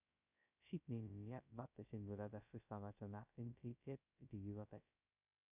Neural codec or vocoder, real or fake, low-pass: codec, 16 kHz, 0.2 kbps, FocalCodec; fake; 3.6 kHz